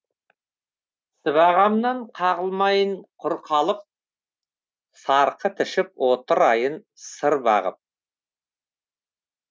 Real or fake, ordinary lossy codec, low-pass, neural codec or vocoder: real; none; none; none